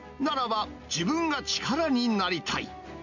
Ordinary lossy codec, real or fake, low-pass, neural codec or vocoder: none; real; 7.2 kHz; none